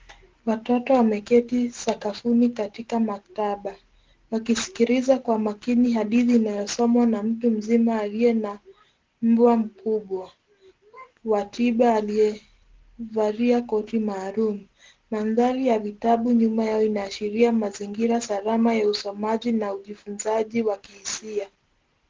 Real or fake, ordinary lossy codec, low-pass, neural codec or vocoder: real; Opus, 16 kbps; 7.2 kHz; none